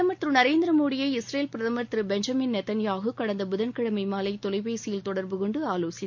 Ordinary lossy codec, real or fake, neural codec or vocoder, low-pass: MP3, 48 kbps; real; none; 7.2 kHz